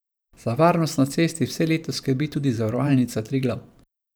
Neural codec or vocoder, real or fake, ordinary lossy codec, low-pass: vocoder, 44.1 kHz, 128 mel bands every 512 samples, BigVGAN v2; fake; none; none